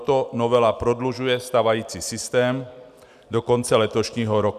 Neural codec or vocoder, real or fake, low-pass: none; real; 14.4 kHz